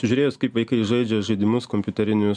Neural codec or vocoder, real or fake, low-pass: none; real; 9.9 kHz